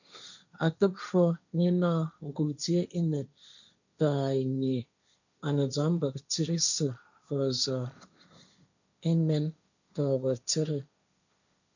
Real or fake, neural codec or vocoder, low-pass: fake; codec, 16 kHz, 1.1 kbps, Voila-Tokenizer; 7.2 kHz